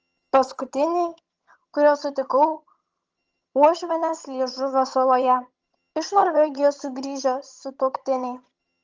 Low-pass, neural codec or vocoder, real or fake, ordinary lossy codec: 7.2 kHz; vocoder, 22.05 kHz, 80 mel bands, HiFi-GAN; fake; Opus, 24 kbps